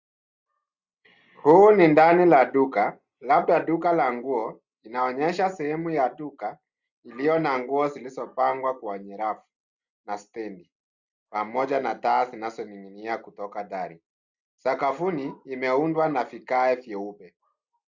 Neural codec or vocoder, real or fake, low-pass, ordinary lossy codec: none; real; 7.2 kHz; Opus, 64 kbps